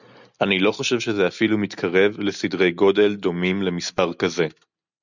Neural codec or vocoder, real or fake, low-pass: none; real; 7.2 kHz